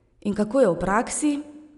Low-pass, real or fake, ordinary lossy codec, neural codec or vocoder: 10.8 kHz; real; MP3, 96 kbps; none